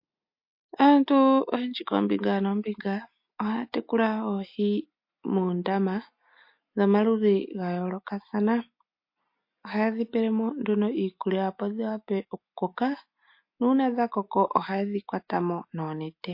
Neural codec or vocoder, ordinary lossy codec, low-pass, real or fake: none; MP3, 32 kbps; 5.4 kHz; real